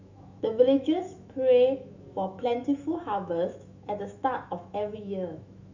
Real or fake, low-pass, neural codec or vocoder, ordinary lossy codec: fake; 7.2 kHz; autoencoder, 48 kHz, 128 numbers a frame, DAC-VAE, trained on Japanese speech; none